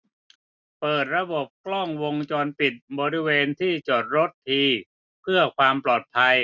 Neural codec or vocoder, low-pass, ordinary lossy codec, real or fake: none; 7.2 kHz; none; real